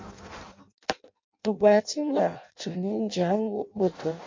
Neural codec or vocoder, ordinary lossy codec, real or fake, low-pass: codec, 16 kHz in and 24 kHz out, 0.6 kbps, FireRedTTS-2 codec; MP3, 48 kbps; fake; 7.2 kHz